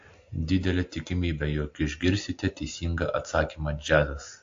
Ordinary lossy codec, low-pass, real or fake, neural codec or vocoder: AAC, 48 kbps; 7.2 kHz; real; none